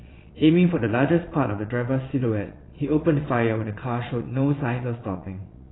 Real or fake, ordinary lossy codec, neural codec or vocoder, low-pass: fake; AAC, 16 kbps; vocoder, 22.05 kHz, 80 mel bands, Vocos; 7.2 kHz